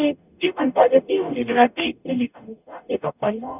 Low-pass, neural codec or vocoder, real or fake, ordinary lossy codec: 3.6 kHz; codec, 44.1 kHz, 0.9 kbps, DAC; fake; none